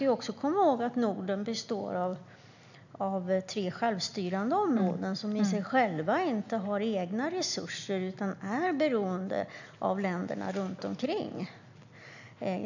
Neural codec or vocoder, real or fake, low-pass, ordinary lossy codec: none; real; 7.2 kHz; none